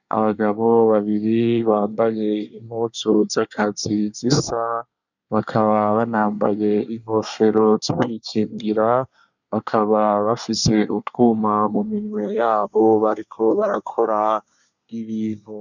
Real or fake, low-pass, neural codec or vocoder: fake; 7.2 kHz; codec, 24 kHz, 1 kbps, SNAC